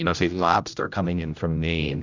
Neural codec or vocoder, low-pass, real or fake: codec, 16 kHz, 0.5 kbps, X-Codec, HuBERT features, trained on general audio; 7.2 kHz; fake